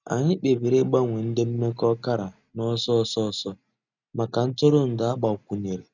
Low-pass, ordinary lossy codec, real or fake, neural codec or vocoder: 7.2 kHz; none; real; none